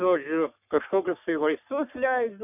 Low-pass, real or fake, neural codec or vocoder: 3.6 kHz; fake; codec, 44.1 kHz, 3.4 kbps, Pupu-Codec